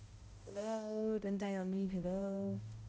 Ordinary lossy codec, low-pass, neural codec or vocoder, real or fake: none; none; codec, 16 kHz, 0.5 kbps, X-Codec, HuBERT features, trained on balanced general audio; fake